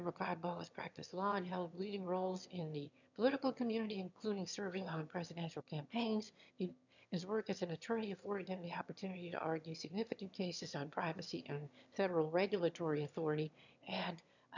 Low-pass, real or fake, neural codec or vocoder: 7.2 kHz; fake; autoencoder, 22.05 kHz, a latent of 192 numbers a frame, VITS, trained on one speaker